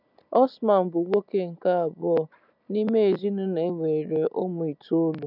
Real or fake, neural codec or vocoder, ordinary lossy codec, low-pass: real; none; none; 5.4 kHz